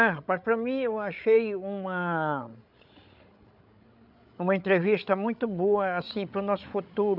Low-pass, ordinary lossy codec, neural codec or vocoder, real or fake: 5.4 kHz; none; codec, 16 kHz, 16 kbps, FreqCodec, larger model; fake